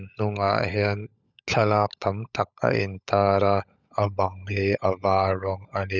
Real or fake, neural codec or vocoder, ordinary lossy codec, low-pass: fake; codec, 16 kHz, 16 kbps, FunCodec, trained on LibriTTS, 50 frames a second; none; 7.2 kHz